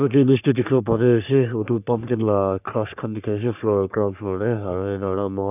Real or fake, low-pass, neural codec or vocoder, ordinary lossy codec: fake; 3.6 kHz; codec, 44.1 kHz, 3.4 kbps, Pupu-Codec; none